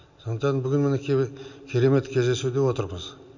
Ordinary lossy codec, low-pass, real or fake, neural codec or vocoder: none; 7.2 kHz; real; none